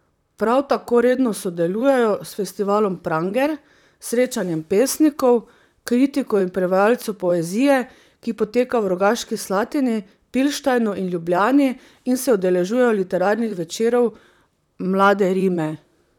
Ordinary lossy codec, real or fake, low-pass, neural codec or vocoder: none; fake; 19.8 kHz; vocoder, 44.1 kHz, 128 mel bands, Pupu-Vocoder